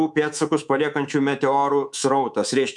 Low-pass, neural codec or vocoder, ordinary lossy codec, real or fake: 10.8 kHz; codec, 24 kHz, 3.1 kbps, DualCodec; MP3, 96 kbps; fake